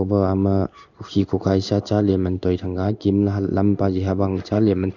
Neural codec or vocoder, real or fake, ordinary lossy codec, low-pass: codec, 16 kHz in and 24 kHz out, 1 kbps, XY-Tokenizer; fake; none; 7.2 kHz